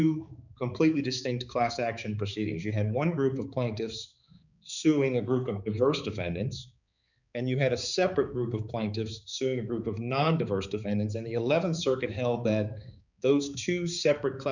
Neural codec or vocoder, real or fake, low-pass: codec, 16 kHz, 4 kbps, X-Codec, HuBERT features, trained on balanced general audio; fake; 7.2 kHz